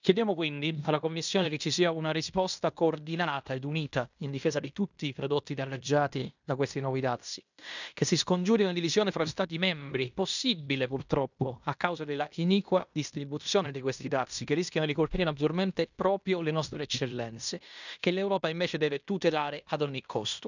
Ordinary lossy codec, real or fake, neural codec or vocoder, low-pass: none; fake; codec, 16 kHz in and 24 kHz out, 0.9 kbps, LongCat-Audio-Codec, fine tuned four codebook decoder; 7.2 kHz